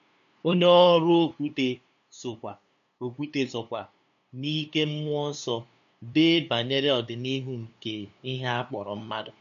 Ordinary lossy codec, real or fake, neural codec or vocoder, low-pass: none; fake; codec, 16 kHz, 2 kbps, FunCodec, trained on LibriTTS, 25 frames a second; 7.2 kHz